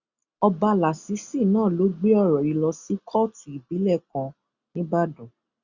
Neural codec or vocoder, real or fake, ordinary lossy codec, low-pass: none; real; Opus, 64 kbps; 7.2 kHz